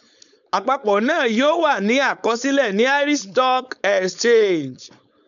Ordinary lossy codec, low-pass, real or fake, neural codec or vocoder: none; 7.2 kHz; fake; codec, 16 kHz, 4.8 kbps, FACodec